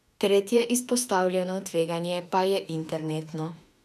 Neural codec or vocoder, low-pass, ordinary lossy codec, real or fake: autoencoder, 48 kHz, 32 numbers a frame, DAC-VAE, trained on Japanese speech; 14.4 kHz; none; fake